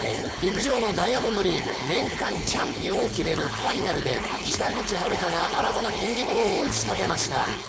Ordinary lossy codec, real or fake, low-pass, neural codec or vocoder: none; fake; none; codec, 16 kHz, 4.8 kbps, FACodec